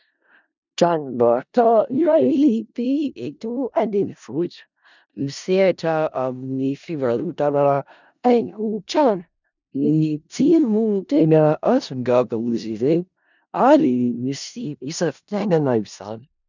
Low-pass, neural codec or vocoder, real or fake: 7.2 kHz; codec, 16 kHz in and 24 kHz out, 0.4 kbps, LongCat-Audio-Codec, four codebook decoder; fake